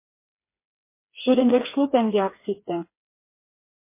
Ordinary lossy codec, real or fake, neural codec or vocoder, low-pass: MP3, 24 kbps; fake; codec, 16 kHz, 4 kbps, FreqCodec, smaller model; 3.6 kHz